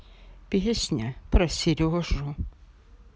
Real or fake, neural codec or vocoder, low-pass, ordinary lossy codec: real; none; none; none